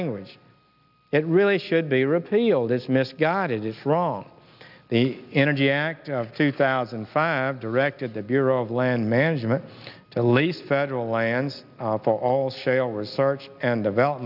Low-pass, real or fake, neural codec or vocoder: 5.4 kHz; real; none